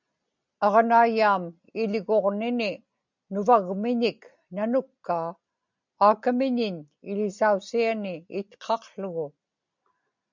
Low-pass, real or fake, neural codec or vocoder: 7.2 kHz; real; none